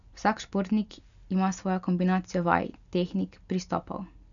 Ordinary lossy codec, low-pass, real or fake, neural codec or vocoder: none; 7.2 kHz; real; none